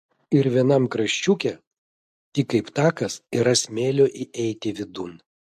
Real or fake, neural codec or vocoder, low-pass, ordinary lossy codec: real; none; 14.4 kHz; MP3, 64 kbps